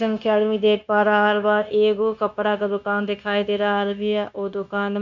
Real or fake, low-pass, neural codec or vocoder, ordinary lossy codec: fake; 7.2 kHz; codec, 16 kHz, 0.9 kbps, LongCat-Audio-Codec; none